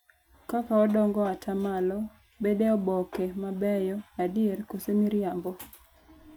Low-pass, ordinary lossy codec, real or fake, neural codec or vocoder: none; none; real; none